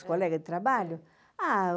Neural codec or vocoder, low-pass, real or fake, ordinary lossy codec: none; none; real; none